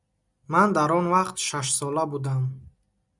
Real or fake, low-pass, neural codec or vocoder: real; 10.8 kHz; none